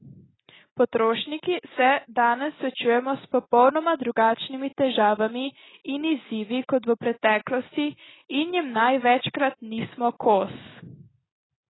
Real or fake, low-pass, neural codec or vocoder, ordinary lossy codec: real; 7.2 kHz; none; AAC, 16 kbps